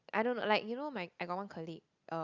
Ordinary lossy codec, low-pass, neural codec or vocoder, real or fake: Opus, 64 kbps; 7.2 kHz; none; real